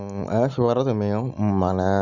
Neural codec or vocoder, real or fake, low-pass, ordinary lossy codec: none; real; 7.2 kHz; Opus, 64 kbps